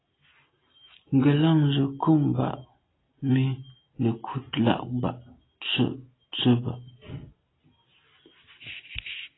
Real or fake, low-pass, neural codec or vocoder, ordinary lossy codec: real; 7.2 kHz; none; AAC, 16 kbps